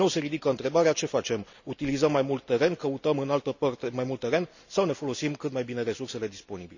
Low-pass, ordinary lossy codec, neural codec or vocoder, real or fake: 7.2 kHz; none; none; real